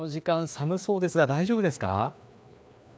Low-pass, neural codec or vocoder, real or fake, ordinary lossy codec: none; codec, 16 kHz, 2 kbps, FreqCodec, larger model; fake; none